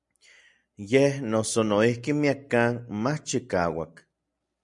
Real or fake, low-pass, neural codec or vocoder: real; 10.8 kHz; none